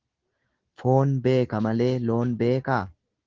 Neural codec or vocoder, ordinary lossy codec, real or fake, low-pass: none; Opus, 16 kbps; real; 7.2 kHz